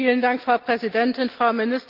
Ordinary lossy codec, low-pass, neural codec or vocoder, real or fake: Opus, 32 kbps; 5.4 kHz; none; real